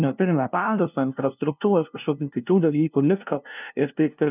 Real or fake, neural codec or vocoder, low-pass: fake; codec, 16 kHz, 0.5 kbps, FunCodec, trained on LibriTTS, 25 frames a second; 3.6 kHz